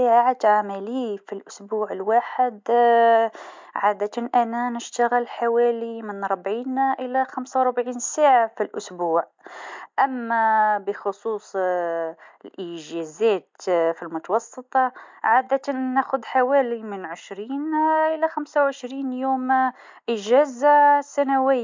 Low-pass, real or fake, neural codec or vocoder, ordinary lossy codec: 7.2 kHz; real; none; MP3, 64 kbps